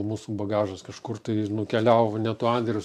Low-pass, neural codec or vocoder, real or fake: 14.4 kHz; vocoder, 44.1 kHz, 128 mel bands every 512 samples, BigVGAN v2; fake